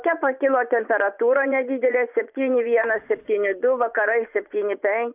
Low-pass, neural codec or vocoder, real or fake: 3.6 kHz; vocoder, 24 kHz, 100 mel bands, Vocos; fake